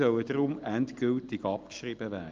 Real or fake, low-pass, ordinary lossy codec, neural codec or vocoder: real; 7.2 kHz; Opus, 24 kbps; none